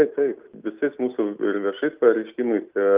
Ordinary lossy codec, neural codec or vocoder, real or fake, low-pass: Opus, 24 kbps; none; real; 3.6 kHz